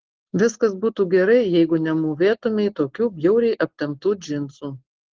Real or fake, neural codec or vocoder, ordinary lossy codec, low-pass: real; none; Opus, 16 kbps; 7.2 kHz